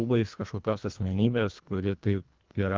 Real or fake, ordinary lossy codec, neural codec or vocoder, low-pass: fake; Opus, 24 kbps; codec, 24 kHz, 1.5 kbps, HILCodec; 7.2 kHz